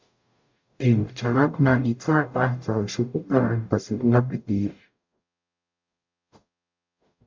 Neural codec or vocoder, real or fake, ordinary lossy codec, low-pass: codec, 44.1 kHz, 0.9 kbps, DAC; fake; MP3, 64 kbps; 7.2 kHz